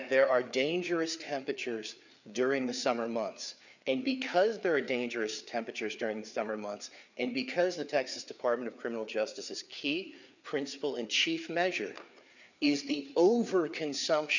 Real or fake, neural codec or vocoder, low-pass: fake; codec, 16 kHz, 4 kbps, FreqCodec, larger model; 7.2 kHz